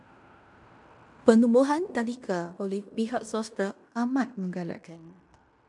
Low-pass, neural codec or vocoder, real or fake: 10.8 kHz; codec, 16 kHz in and 24 kHz out, 0.9 kbps, LongCat-Audio-Codec, fine tuned four codebook decoder; fake